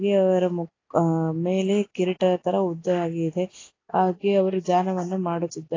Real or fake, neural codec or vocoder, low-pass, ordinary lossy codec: real; none; 7.2 kHz; AAC, 32 kbps